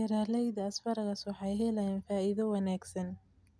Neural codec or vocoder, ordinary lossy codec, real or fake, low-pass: none; none; real; none